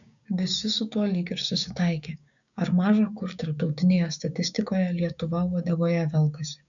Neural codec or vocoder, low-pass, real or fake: codec, 16 kHz, 6 kbps, DAC; 7.2 kHz; fake